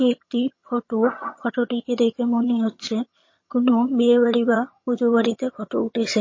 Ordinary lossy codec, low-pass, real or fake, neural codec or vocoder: MP3, 32 kbps; 7.2 kHz; fake; vocoder, 22.05 kHz, 80 mel bands, HiFi-GAN